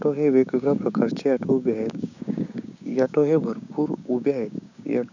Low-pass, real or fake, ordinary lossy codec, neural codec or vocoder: 7.2 kHz; fake; none; codec, 16 kHz, 6 kbps, DAC